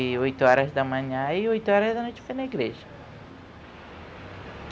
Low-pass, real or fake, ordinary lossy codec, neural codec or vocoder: none; real; none; none